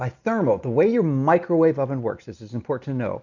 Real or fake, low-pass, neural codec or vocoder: real; 7.2 kHz; none